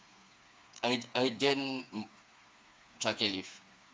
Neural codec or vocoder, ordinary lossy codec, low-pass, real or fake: codec, 16 kHz, 4 kbps, FreqCodec, smaller model; none; none; fake